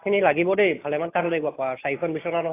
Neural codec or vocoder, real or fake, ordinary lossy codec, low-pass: none; real; AAC, 24 kbps; 3.6 kHz